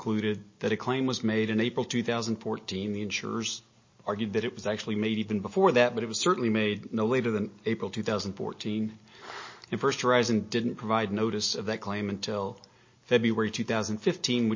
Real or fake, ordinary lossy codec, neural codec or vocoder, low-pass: real; MP3, 32 kbps; none; 7.2 kHz